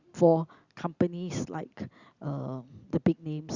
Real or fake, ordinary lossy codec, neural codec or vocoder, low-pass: real; Opus, 64 kbps; none; 7.2 kHz